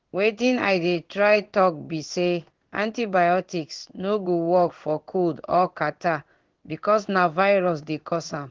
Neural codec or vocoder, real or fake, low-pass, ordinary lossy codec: codec, 16 kHz in and 24 kHz out, 1 kbps, XY-Tokenizer; fake; 7.2 kHz; Opus, 16 kbps